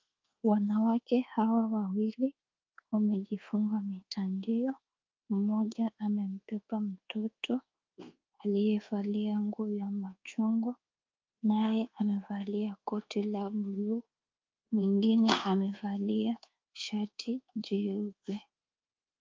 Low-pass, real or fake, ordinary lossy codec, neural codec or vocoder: 7.2 kHz; fake; Opus, 32 kbps; codec, 24 kHz, 1.2 kbps, DualCodec